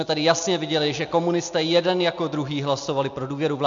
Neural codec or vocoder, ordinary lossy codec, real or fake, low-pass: none; MP3, 64 kbps; real; 7.2 kHz